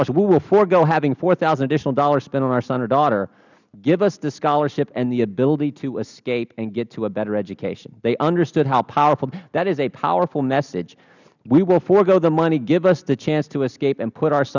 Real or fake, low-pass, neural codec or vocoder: real; 7.2 kHz; none